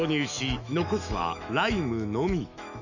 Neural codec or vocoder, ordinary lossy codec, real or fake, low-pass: autoencoder, 48 kHz, 128 numbers a frame, DAC-VAE, trained on Japanese speech; none; fake; 7.2 kHz